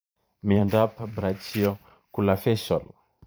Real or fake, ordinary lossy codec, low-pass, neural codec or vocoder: fake; none; none; vocoder, 44.1 kHz, 128 mel bands every 512 samples, BigVGAN v2